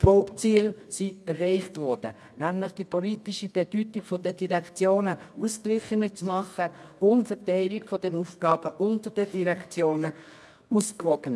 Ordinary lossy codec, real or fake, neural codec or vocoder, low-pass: none; fake; codec, 24 kHz, 0.9 kbps, WavTokenizer, medium music audio release; none